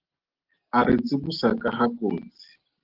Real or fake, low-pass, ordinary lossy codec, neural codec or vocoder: real; 5.4 kHz; Opus, 16 kbps; none